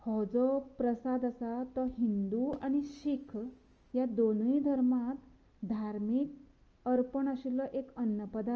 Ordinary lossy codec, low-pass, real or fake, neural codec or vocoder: Opus, 24 kbps; 7.2 kHz; real; none